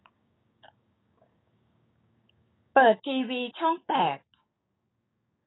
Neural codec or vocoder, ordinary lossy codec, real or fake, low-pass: codec, 16 kHz, 6 kbps, DAC; AAC, 16 kbps; fake; 7.2 kHz